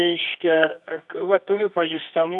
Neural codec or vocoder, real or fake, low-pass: codec, 24 kHz, 0.9 kbps, WavTokenizer, medium music audio release; fake; 10.8 kHz